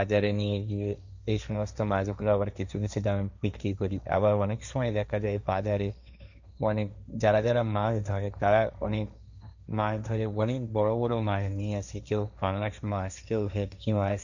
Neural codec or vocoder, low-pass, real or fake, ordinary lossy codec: codec, 16 kHz, 1.1 kbps, Voila-Tokenizer; 7.2 kHz; fake; none